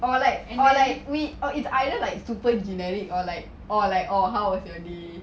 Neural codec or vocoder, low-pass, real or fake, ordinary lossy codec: none; none; real; none